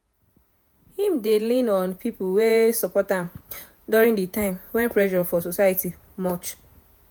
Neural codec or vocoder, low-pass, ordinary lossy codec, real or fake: vocoder, 48 kHz, 128 mel bands, Vocos; none; none; fake